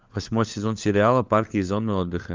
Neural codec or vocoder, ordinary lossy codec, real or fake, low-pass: codec, 16 kHz, 4 kbps, FunCodec, trained on LibriTTS, 50 frames a second; Opus, 24 kbps; fake; 7.2 kHz